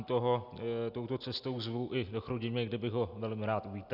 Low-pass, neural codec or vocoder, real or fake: 5.4 kHz; none; real